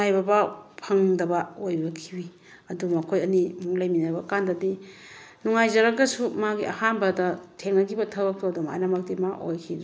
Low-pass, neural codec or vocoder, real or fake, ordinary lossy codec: none; none; real; none